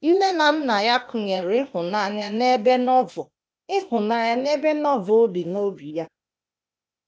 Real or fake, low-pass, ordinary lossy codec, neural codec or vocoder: fake; none; none; codec, 16 kHz, 0.8 kbps, ZipCodec